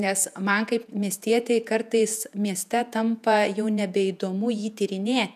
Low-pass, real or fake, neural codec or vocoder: 14.4 kHz; fake; vocoder, 48 kHz, 128 mel bands, Vocos